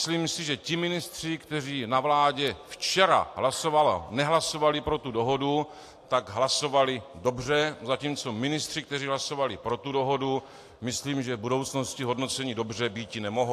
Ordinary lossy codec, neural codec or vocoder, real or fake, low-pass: AAC, 64 kbps; none; real; 14.4 kHz